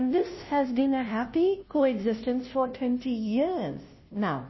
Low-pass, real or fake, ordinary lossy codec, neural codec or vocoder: 7.2 kHz; fake; MP3, 24 kbps; codec, 16 kHz, 0.5 kbps, FunCodec, trained on Chinese and English, 25 frames a second